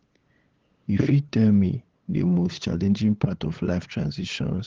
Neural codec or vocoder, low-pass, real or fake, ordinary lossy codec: codec, 16 kHz, 8 kbps, FunCodec, trained on LibriTTS, 25 frames a second; 7.2 kHz; fake; Opus, 16 kbps